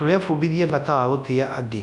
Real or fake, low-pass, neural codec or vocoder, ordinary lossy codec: fake; 10.8 kHz; codec, 24 kHz, 0.9 kbps, WavTokenizer, large speech release; none